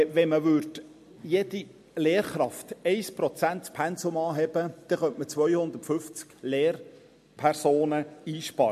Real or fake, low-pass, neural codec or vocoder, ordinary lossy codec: real; 14.4 kHz; none; MP3, 64 kbps